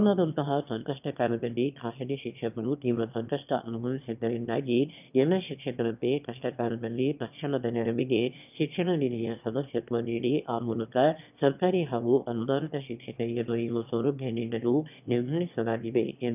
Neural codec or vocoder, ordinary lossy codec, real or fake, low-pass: autoencoder, 22.05 kHz, a latent of 192 numbers a frame, VITS, trained on one speaker; none; fake; 3.6 kHz